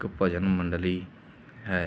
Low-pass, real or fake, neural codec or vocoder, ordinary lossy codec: none; real; none; none